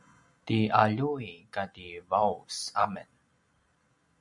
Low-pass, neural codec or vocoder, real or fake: 10.8 kHz; vocoder, 44.1 kHz, 128 mel bands every 256 samples, BigVGAN v2; fake